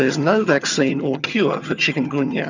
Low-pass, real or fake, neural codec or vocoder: 7.2 kHz; fake; vocoder, 22.05 kHz, 80 mel bands, HiFi-GAN